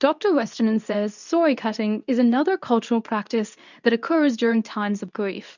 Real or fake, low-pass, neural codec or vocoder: fake; 7.2 kHz; codec, 24 kHz, 0.9 kbps, WavTokenizer, medium speech release version 2